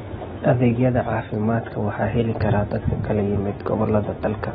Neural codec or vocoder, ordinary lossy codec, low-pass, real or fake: none; AAC, 16 kbps; 14.4 kHz; real